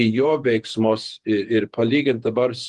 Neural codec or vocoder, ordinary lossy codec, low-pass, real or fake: vocoder, 48 kHz, 128 mel bands, Vocos; Opus, 24 kbps; 10.8 kHz; fake